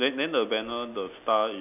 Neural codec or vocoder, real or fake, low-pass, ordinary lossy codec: none; real; 3.6 kHz; none